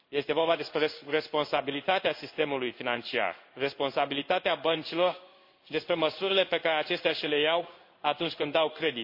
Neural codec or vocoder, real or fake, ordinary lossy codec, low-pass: codec, 16 kHz in and 24 kHz out, 1 kbps, XY-Tokenizer; fake; MP3, 32 kbps; 5.4 kHz